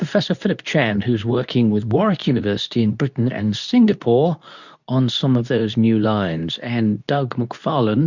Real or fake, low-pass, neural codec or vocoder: fake; 7.2 kHz; codec, 24 kHz, 0.9 kbps, WavTokenizer, medium speech release version 2